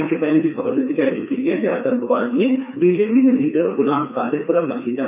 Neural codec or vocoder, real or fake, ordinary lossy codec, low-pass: codec, 16 kHz, 2 kbps, FreqCodec, larger model; fake; none; 3.6 kHz